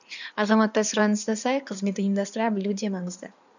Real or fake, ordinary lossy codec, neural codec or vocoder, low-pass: fake; none; codec, 16 kHz in and 24 kHz out, 2.2 kbps, FireRedTTS-2 codec; 7.2 kHz